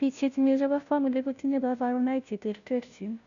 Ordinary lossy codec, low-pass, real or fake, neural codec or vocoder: none; 7.2 kHz; fake; codec, 16 kHz, 0.5 kbps, FunCodec, trained on Chinese and English, 25 frames a second